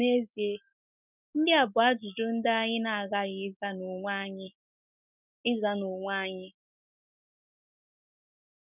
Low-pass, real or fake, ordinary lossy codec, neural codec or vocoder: 3.6 kHz; real; none; none